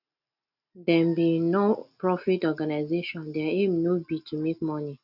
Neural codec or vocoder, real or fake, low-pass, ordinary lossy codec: none; real; 5.4 kHz; none